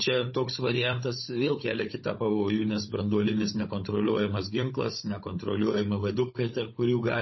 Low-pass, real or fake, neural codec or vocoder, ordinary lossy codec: 7.2 kHz; fake; codec, 16 kHz, 16 kbps, FunCodec, trained on Chinese and English, 50 frames a second; MP3, 24 kbps